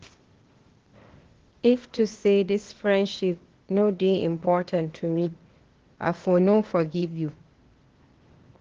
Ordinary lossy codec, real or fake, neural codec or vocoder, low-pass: Opus, 16 kbps; fake; codec, 16 kHz, 0.8 kbps, ZipCodec; 7.2 kHz